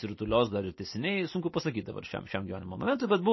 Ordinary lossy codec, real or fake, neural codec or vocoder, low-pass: MP3, 24 kbps; real; none; 7.2 kHz